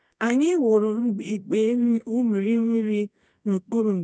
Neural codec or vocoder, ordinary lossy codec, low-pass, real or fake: codec, 24 kHz, 0.9 kbps, WavTokenizer, medium music audio release; none; 9.9 kHz; fake